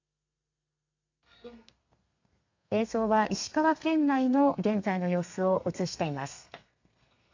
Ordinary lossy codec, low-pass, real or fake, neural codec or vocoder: AAC, 48 kbps; 7.2 kHz; fake; codec, 32 kHz, 1.9 kbps, SNAC